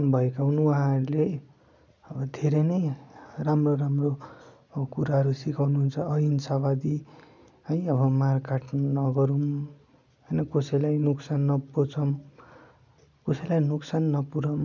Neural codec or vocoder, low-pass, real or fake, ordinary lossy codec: none; 7.2 kHz; real; none